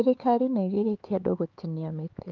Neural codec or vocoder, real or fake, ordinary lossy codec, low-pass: codec, 16 kHz, 4 kbps, X-Codec, HuBERT features, trained on LibriSpeech; fake; Opus, 32 kbps; 7.2 kHz